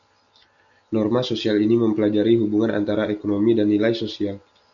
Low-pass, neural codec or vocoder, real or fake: 7.2 kHz; none; real